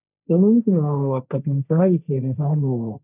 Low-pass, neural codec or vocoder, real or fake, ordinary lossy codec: 3.6 kHz; codec, 16 kHz, 1.1 kbps, Voila-Tokenizer; fake; none